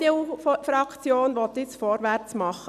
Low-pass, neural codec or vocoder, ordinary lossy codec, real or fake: 14.4 kHz; none; none; real